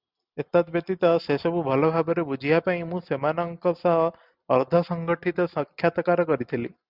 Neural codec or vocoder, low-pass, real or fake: none; 5.4 kHz; real